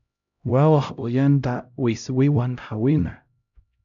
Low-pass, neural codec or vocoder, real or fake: 7.2 kHz; codec, 16 kHz, 0.5 kbps, X-Codec, HuBERT features, trained on LibriSpeech; fake